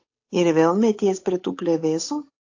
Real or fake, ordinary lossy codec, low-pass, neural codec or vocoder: fake; AAC, 48 kbps; 7.2 kHz; codec, 16 kHz, 8 kbps, FunCodec, trained on Chinese and English, 25 frames a second